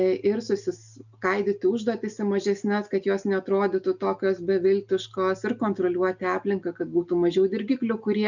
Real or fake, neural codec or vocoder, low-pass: real; none; 7.2 kHz